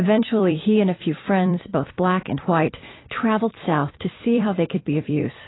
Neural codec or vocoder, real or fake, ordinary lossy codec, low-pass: vocoder, 44.1 kHz, 128 mel bands every 256 samples, BigVGAN v2; fake; AAC, 16 kbps; 7.2 kHz